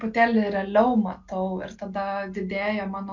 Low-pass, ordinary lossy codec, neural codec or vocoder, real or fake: 7.2 kHz; MP3, 64 kbps; none; real